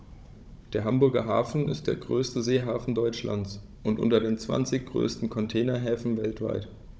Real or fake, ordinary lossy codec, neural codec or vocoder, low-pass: fake; none; codec, 16 kHz, 16 kbps, FunCodec, trained on Chinese and English, 50 frames a second; none